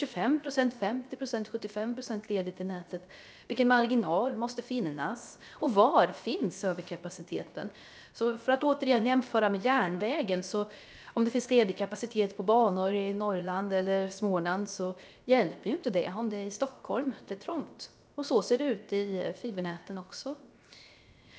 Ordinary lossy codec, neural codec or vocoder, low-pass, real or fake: none; codec, 16 kHz, 0.7 kbps, FocalCodec; none; fake